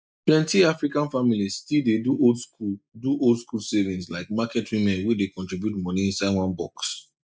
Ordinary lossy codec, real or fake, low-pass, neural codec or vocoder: none; real; none; none